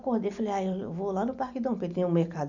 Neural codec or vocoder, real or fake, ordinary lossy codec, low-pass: none; real; none; 7.2 kHz